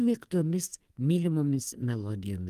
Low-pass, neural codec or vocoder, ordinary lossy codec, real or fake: 14.4 kHz; codec, 44.1 kHz, 2.6 kbps, SNAC; Opus, 32 kbps; fake